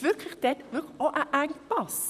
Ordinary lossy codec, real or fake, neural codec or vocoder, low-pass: none; fake; vocoder, 44.1 kHz, 128 mel bands, Pupu-Vocoder; 14.4 kHz